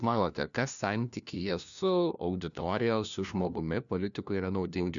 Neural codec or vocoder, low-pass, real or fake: codec, 16 kHz, 1 kbps, FunCodec, trained on LibriTTS, 50 frames a second; 7.2 kHz; fake